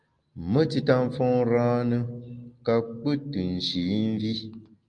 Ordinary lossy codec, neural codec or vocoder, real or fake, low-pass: Opus, 32 kbps; none; real; 9.9 kHz